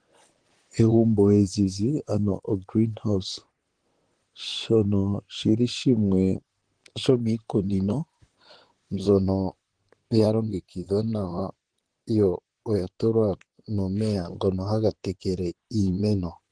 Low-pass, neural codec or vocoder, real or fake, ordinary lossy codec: 9.9 kHz; vocoder, 44.1 kHz, 128 mel bands, Pupu-Vocoder; fake; Opus, 16 kbps